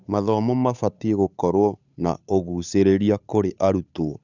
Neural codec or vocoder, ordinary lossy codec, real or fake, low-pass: codec, 16 kHz, 8 kbps, FunCodec, trained on Chinese and English, 25 frames a second; none; fake; 7.2 kHz